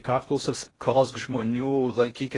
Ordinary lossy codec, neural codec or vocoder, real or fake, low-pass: AAC, 32 kbps; codec, 16 kHz in and 24 kHz out, 0.6 kbps, FocalCodec, streaming, 2048 codes; fake; 10.8 kHz